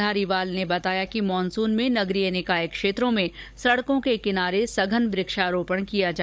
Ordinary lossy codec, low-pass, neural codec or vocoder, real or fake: none; none; codec, 16 kHz, 16 kbps, FunCodec, trained on Chinese and English, 50 frames a second; fake